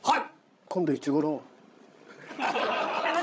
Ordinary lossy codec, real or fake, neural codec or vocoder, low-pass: none; fake; codec, 16 kHz, 16 kbps, FreqCodec, larger model; none